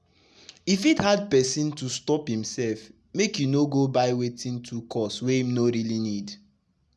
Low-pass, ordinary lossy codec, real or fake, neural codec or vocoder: none; none; real; none